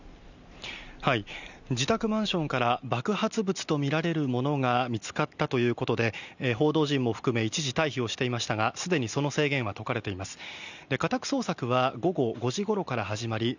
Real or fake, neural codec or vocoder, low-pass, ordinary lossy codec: real; none; 7.2 kHz; none